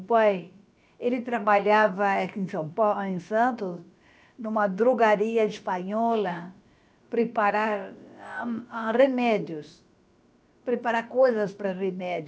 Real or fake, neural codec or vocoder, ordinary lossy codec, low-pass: fake; codec, 16 kHz, about 1 kbps, DyCAST, with the encoder's durations; none; none